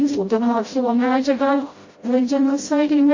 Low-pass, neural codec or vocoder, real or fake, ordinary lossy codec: 7.2 kHz; codec, 16 kHz, 0.5 kbps, FreqCodec, smaller model; fake; MP3, 32 kbps